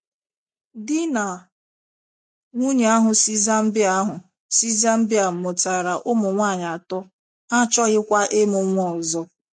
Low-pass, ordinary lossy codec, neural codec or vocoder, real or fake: 9.9 kHz; MP3, 48 kbps; none; real